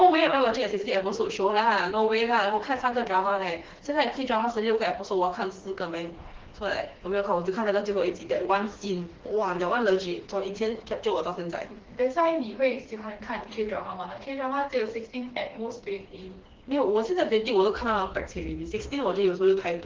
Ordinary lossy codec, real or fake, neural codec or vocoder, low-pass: Opus, 16 kbps; fake; codec, 16 kHz, 2 kbps, FreqCodec, smaller model; 7.2 kHz